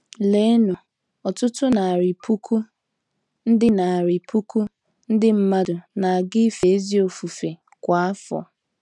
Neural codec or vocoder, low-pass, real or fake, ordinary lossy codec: none; 10.8 kHz; real; none